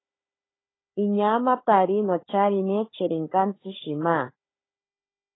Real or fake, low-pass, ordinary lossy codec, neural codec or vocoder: fake; 7.2 kHz; AAC, 16 kbps; codec, 16 kHz, 16 kbps, FunCodec, trained on Chinese and English, 50 frames a second